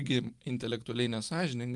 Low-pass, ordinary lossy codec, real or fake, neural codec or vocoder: 10.8 kHz; Opus, 32 kbps; real; none